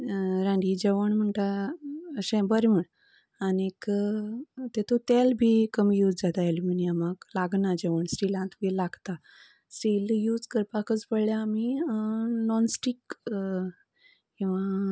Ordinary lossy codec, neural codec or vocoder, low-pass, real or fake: none; none; none; real